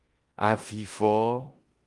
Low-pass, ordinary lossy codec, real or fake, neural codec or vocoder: 10.8 kHz; Opus, 24 kbps; fake; codec, 16 kHz in and 24 kHz out, 0.9 kbps, LongCat-Audio-Codec, four codebook decoder